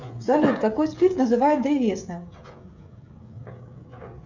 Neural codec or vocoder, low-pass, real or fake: codec, 16 kHz, 16 kbps, FreqCodec, smaller model; 7.2 kHz; fake